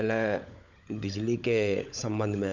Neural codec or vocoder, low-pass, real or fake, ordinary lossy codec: codec, 16 kHz, 16 kbps, FunCodec, trained on LibriTTS, 50 frames a second; 7.2 kHz; fake; none